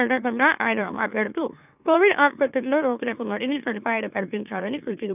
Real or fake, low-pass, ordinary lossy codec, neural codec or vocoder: fake; 3.6 kHz; none; autoencoder, 44.1 kHz, a latent of 192 numbers a frame, MeloTTS